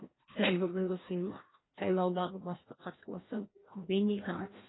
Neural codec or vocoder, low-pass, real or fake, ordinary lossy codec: codec, 16 kHz, 1 kbps, FreqCodec, larger model; 7.2 kHz; fake; AAC, 16 kbps